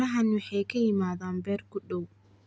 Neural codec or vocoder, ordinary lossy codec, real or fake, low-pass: none; none; real; none